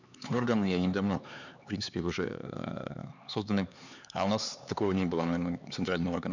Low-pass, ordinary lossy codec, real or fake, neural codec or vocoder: 7.2 kHz; none; fake; codec, 16 kHz, 4 kbps, X-Codec, HuBERT features, trained on LibriSpeech